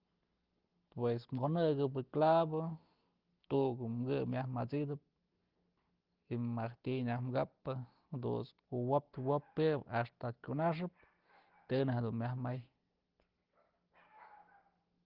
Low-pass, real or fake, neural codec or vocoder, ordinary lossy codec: 5.4 kHz; real; none; Opus, 16 kbps